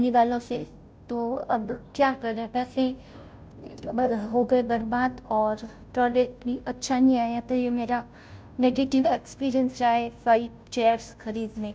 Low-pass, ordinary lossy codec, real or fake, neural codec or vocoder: none; none; fake; codec, 16 kHz, 0.5 kbps, FunCodec, trained on Chinese and English, 25 frames a second